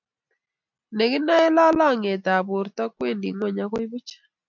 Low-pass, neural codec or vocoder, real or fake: 7.2 kHz; none; real